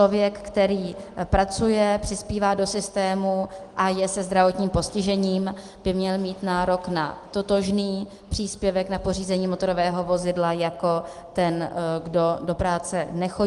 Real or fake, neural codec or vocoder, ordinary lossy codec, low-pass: real; none; Opus, 24 kbps; 10.8 kHz